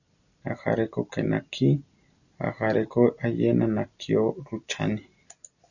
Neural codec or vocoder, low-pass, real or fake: none; 7.2 kHz; real